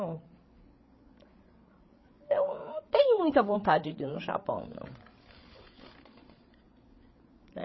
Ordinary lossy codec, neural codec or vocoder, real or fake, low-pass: MP3, 24 kbps; codec, 16 kHz, 8 kbps, FreqCodec, larger model; fake; 7.2 kHz